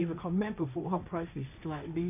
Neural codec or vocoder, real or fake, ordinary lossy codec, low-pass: codec, 16 kHz, 1.1 kbps, Voila-Tokenizer; fake; none; 3.6 kHz